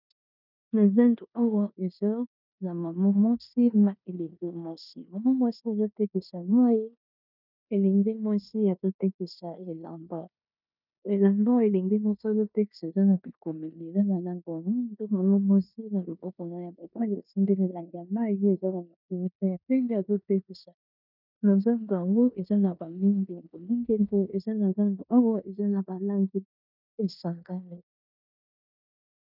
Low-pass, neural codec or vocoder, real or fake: 5.4 kHz; codec, 16 kHz in and 24 kHz out, 0.9 kbps, LongCat-Audio-Codec, four codebook decoder; fake